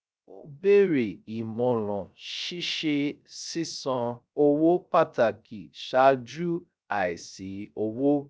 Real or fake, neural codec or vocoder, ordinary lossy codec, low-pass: fake; codec, 16 kHz, 0.3 kbps, FocalCodec; none; none